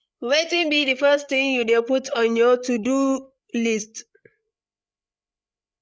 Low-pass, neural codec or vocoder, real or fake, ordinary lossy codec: none; codec, 16 kHz, 8 kbps, FreqCodec, larger model; fake; none